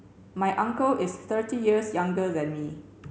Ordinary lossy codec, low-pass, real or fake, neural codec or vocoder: none; none; real; none